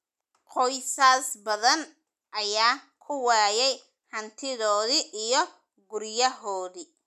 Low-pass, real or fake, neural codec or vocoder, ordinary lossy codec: 14.4 kHz; real; none; none